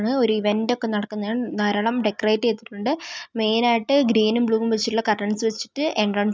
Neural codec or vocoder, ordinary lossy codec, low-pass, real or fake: none; none; none; real